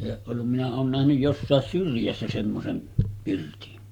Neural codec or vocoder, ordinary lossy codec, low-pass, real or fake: codec, 44.1 kHz, 7.8 kbps, Pupu-Codec; none; 19.8 kHz; fake